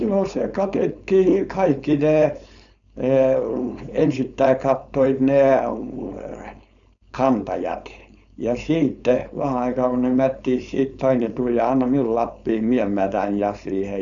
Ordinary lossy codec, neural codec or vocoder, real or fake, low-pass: none; codec, 16 kHz, 4.8 kbps, FACodec; fake; 7.2 kHz